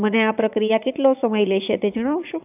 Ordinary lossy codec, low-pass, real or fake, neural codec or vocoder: none; 3.6 kHz; fake; vocoder, 22.05 kHz, 80 mel bands, Vocos